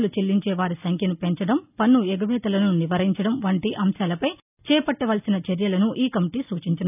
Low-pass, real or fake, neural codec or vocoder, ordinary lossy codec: 3.6 kHz; real; none; none